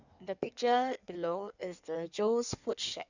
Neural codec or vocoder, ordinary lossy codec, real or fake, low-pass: codec, 16 kHz in and 24 kHz out, 1.1 kbps, FireRedTTS-2 codec; none; fake; 7.2 kHz